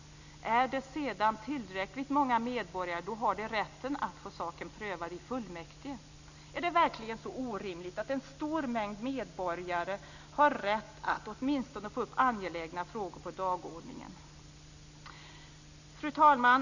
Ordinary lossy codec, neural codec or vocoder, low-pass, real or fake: none; none; 7.2 kHz; real